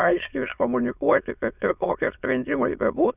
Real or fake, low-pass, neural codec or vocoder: fake; 3.6 kHz; autoencoder, 22.05 kHz, a latent of 192 numbers a frame, VITS, trained on many speakers